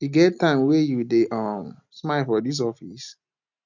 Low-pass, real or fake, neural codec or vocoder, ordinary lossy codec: 7.2 kHz; real; none; none